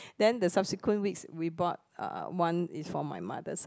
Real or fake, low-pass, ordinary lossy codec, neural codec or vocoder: real; none; none; none